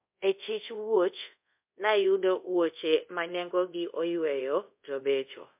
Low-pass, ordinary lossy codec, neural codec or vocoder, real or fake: 3.6 kHz; MP3, 32 kbps; codec, 24 kHz, 0.5 kbps, DualCodec; fake